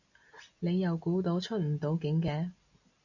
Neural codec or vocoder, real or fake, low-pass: none; real; 7.2 kHz